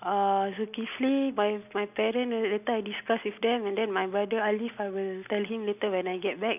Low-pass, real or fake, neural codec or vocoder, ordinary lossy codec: 3.6 kHz; real; none; none